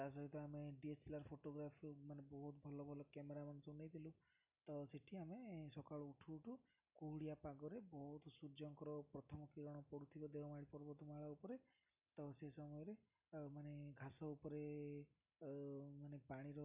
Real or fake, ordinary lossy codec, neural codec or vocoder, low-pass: real; none; none; 3.6 kHz